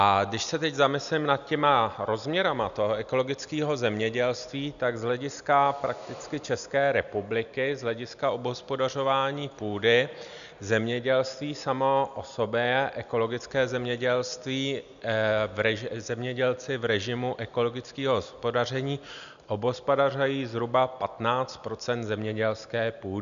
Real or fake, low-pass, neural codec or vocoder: real; 7.2 kHz; none